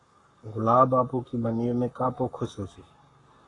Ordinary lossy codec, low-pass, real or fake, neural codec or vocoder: AAC, 32 kbps; 10.8 kHz; fake; codec, 44.1 kHz, 7.8 kbps, Pupu-Codec